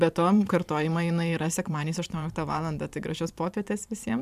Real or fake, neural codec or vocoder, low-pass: fake; vocoder, 44.1 kHz, 128 mel bands every 512 samples, BigVGAN v2; 14.4 kHz